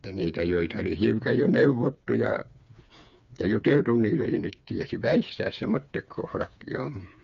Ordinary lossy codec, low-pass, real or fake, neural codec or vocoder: MP3, 64 kbps; 7.2 kHz; fake; codec, 16 kHz, 4 kbps, FreqCodec, smaller model